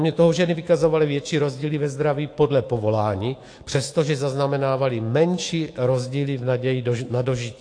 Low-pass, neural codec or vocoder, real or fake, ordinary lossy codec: 9.9 kHz; autoencoder, 48 kHz, 128 numbers a frame, DAC-VAE, trained on Japanese speech; fake; AAC, 48 kbps